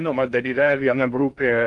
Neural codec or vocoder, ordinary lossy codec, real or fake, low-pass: codec, 16 kHz in and 24 kHz out, 0.6 kbps, FocalCodec, streaming, 2048 codes; Opus, 32 kbps; fake; 10.8 kHz